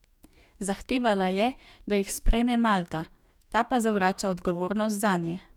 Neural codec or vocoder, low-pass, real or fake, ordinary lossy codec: codec, 44.1 kHz, 2.6 kbps, DAC; 19.8 kHz; fake; none